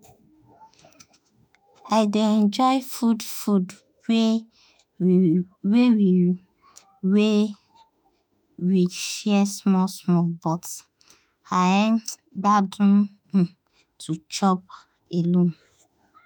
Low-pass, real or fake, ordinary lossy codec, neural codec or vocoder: none; fake; none; autoencoder, 48 kHz, 32 numbers a frame, DAC-VAE, trained on Japanese speech